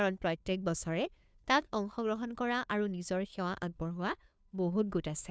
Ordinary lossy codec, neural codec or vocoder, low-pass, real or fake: none; codec, 16 kHz, 2 kbps, FunCodec, trained on Chinese and English, 25 frames a second; none; fake